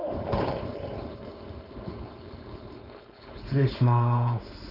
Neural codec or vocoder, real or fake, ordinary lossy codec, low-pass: codec, 16 kHz, 4.8 kbps, FACodec; fake; none; 5.4 kHz